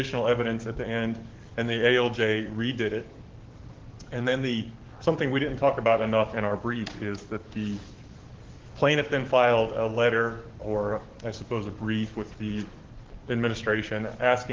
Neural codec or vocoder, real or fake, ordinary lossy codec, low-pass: codec, 44.1 kHz, 7.8 kbps, DAC; fake; Opus, 16 kbps; 7.2 kHz